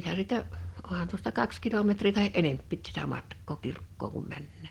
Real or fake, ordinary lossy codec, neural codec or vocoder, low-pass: real; Opus, 16 kbps; none; 19.8 kHz